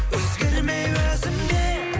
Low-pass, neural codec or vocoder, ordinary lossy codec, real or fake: none; none; none; real